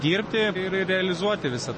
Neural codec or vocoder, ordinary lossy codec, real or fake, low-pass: none; MP3, 32 kbps; real; 10.8 kHz